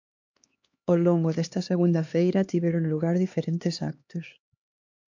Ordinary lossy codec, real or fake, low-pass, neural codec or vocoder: MP3, 48 kbps; fake; 7.2 kHz; codec, 16 kHz, 2 kbps, X-Codec, HuBERT features, trained on LibriSpeech